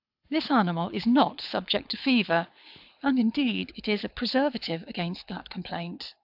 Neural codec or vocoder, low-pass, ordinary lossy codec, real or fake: codec, 24 kHz, 6 kbps, HILCodec; 5.4 kHz; AAC, 48 kbps; fake